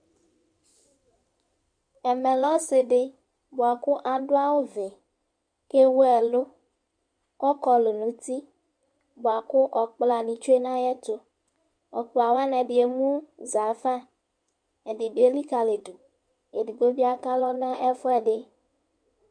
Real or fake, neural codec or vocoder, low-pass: fake; codec, 16 kHz in and 24 kHz out, 2.2 kbps, FireRedTTS-2 codec; 9.9 kHz